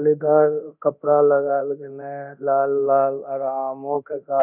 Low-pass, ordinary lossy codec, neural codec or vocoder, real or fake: 3.6 kHz; none; codec, 24 kHz, 0.9 kbps, DualCodec; fake